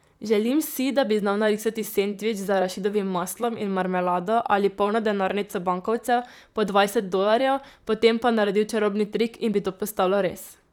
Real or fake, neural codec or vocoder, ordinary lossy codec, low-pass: fake; vocoder, 44.1 kHz, 128 mel bands, Pupu-Vocoder; none; 19.8 kHz